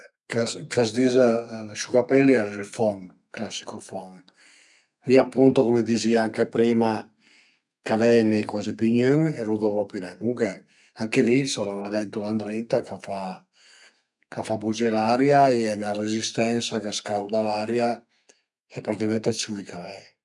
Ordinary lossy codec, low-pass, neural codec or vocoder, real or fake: none; 10.8 kHz; codec, 32 kHz, 1.9 kbps, SNAC; fake